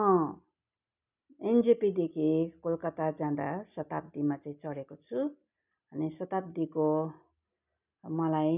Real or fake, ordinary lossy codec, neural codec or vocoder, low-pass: real; none; none; 3.6 kHz